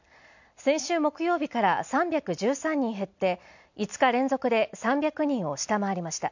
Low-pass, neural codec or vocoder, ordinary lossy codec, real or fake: 7.2 kHz; none; none; real